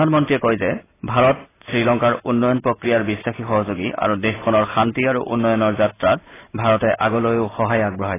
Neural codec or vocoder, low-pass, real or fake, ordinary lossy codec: none; 3.6 kHz; real; AAC, 16 kbps